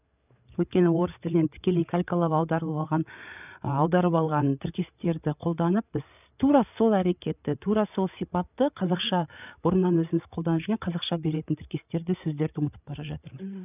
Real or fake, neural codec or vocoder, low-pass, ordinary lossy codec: fake; codec, 16 kHz, 8 kbps, FreqCodec, larger model; 3.6 kHz; none